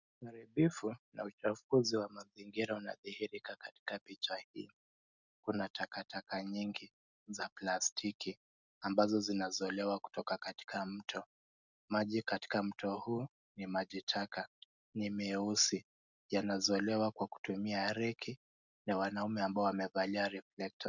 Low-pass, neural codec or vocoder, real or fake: 7.2 kHz; none; real